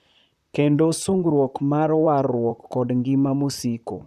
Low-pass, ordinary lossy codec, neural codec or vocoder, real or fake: 14.4 kHz; none; vocoder, 44.1 kHz, 128 mel bands, Pupu-Vocoder; fake